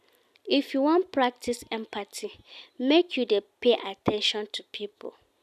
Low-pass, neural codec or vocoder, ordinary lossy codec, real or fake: 14.4 kHz; none; none; real